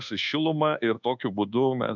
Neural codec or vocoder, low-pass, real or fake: codec, 24 kHz, 1.2 kbps, DualCodec; 7.2 kHz; fake